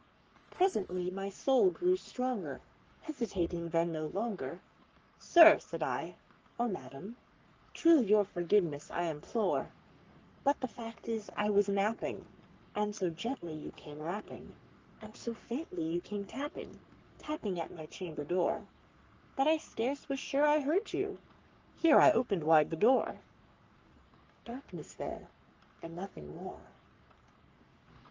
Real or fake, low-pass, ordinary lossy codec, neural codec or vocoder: fake; 7.2 kHz; Opus, 24 kbps; codec, 44.1 kHz, 3.4 kbps, Pupu-Codec